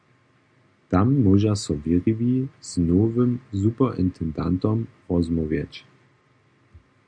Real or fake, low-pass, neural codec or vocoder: real; 9.9 kHz; none